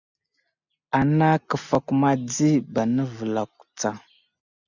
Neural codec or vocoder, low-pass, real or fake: none; 7.2 kHz; real